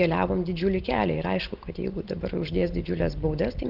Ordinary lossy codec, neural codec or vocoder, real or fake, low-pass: Opus, 16 kbps; none; real; 5.4 kHz